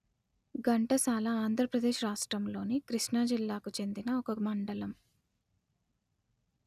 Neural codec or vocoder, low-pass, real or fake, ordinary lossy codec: none; 14.4 kHz; real; none